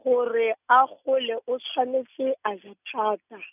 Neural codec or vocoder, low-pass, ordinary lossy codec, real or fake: none; 3.6 kHz; none; real